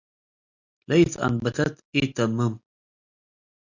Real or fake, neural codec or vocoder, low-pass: real; none; 7.2 kHz